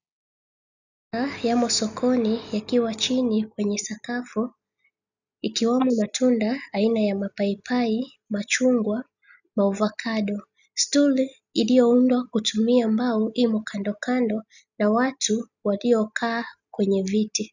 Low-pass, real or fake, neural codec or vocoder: 7.2 kHz; real; none